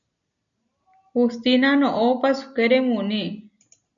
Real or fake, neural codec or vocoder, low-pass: real; none; 7.2 kHz